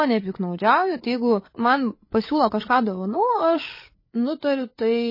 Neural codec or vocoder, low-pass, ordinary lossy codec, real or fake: codec, 16 kHz, 8 kbps, FreqCodec, larger model; 5.4 kHz; MP3, 24 kbps; fake